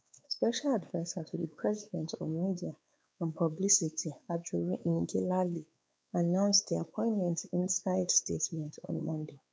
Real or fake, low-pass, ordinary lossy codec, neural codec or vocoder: fake; none; none; codec, 16 kHz, 4 kbps, X-Codec, WavLM features, trained on Multilingual LibriSpeech